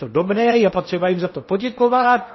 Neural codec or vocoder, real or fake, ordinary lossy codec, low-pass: codec, 16 kHz in and 24 kHz out, 0.8 kbps, FocalCodec, streaming, 65536 codes; fake; MP3, 24 kbps; 7.2 kHz